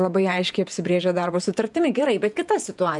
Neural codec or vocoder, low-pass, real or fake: vocoder, 48 kHz, 128 mel bands, Vocos; 10.8 kHz; fake